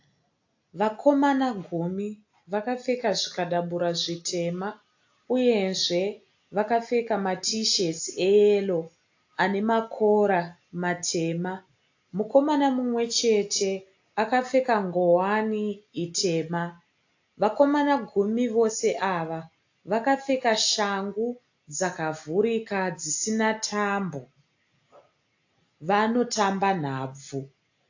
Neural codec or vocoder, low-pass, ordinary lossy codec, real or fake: none; 7.2 kHz; AAC, 48 kbps; real